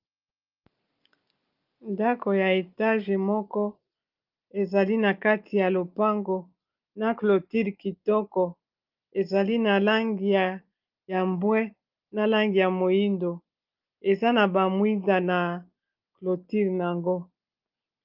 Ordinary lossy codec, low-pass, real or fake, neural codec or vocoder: Opus, 24 kbps; 5.4 kHz; real; none